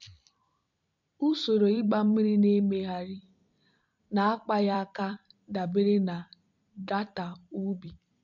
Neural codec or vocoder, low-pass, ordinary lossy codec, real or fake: vocoder, 44.1 kHz, 128 mel bands every 256 samples, BigVGAN v2; 7.2 kHz; MP3, 64 kbps; fake